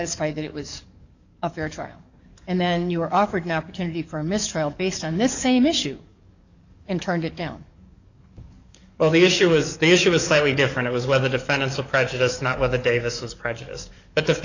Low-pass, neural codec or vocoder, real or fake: 7.2 kHz; codec, 44.1 kHz, 7.8 kbps, DAC; fake